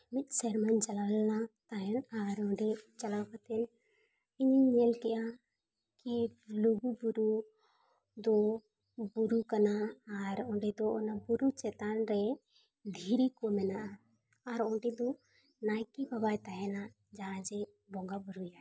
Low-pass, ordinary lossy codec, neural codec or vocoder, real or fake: none; none; none; real